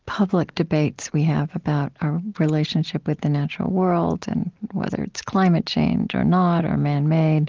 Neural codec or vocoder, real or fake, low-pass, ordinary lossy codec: none; real; 7.2 kHz; Opus, 16 kbps